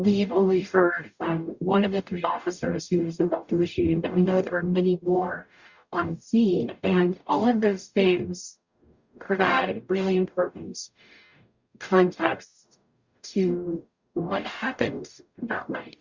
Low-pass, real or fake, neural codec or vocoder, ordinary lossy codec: 7.2 kHz; fake; codec, 44.1 kHz, 0.9 kbps, DAC; Opus, 64 kbps